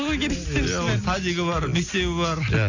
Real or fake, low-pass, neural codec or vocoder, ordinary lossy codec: real; 7.2 kHz; none; none